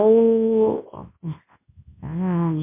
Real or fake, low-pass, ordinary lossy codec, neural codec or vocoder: fake; 3.6 kHz; MP3, 24 kbps; codec, 24 kHz, 0.9 kbps, WavTokenizer, large speech release